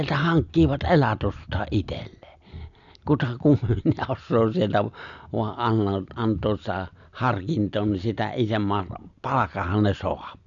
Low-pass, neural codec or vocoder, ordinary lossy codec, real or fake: 7.2 kHz; none; none; real